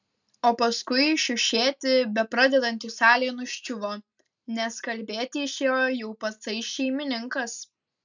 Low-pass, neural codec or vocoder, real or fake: 7.2 kHz; none; real